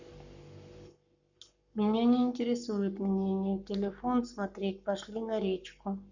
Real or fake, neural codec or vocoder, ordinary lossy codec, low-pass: fake; codec, 44.1 kHz, 7.8 kbps, Pupu-Codec; none; 7.2 kHz